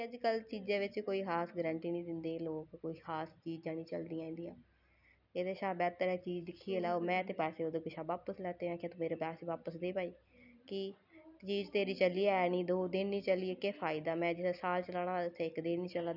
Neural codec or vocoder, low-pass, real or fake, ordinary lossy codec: none; 5.4 kHz; real; none